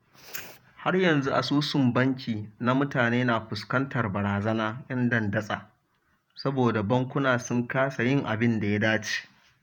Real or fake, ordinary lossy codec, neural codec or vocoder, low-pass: fake; none; vocoder, 48 kHz, 128 mel bands, Vocos; 19.8 kHz